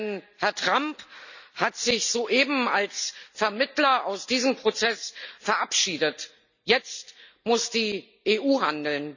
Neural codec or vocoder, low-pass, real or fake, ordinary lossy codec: none; 7.2 kHz; real; none